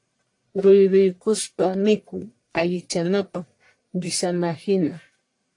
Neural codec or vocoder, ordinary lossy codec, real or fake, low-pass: codec, 44.1 kHz, 1.7 kbps, Pupu-Codec; AAC, 48 kbps; fake; 10.8 kHz